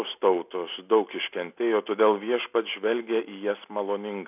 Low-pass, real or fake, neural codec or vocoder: 3.6 kHz; real; none